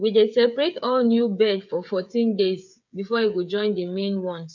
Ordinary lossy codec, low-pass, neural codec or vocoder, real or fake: none; 7.2 kHz; codec, 16 kHz, 8 kbps, FreqCodec, smaller model; fake